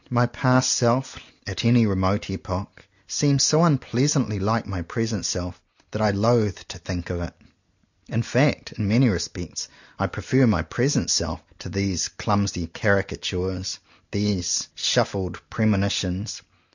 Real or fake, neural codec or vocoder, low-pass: real; none; 7.2 kHz